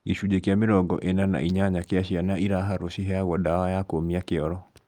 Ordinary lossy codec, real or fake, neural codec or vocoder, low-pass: Opus, 32 kbps; real; none; 19.8 kHz